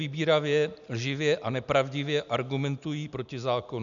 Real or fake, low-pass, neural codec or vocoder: real; 7.2 kHz; none